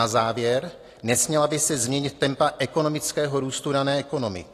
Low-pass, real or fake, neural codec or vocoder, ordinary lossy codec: 14.4 kHz; real; none; AAC, 48 kbps